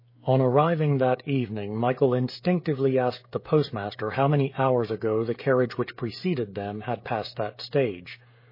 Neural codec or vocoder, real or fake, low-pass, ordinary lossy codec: codec, 16 kHz, 16 kbps, FreqCodec, smaller model; fake; 5.4 kHz; MP3, 24 kbps